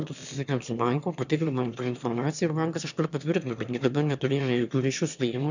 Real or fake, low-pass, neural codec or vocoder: fake; 7.2 kHz; autoencoder, 22.05 kHz, a latent of 192 numbers a frame, VITS, trained on one speaker